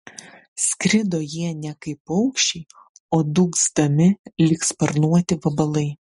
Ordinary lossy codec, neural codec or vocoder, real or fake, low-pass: MP3, 48 kbps; none; real; 19.8 kHz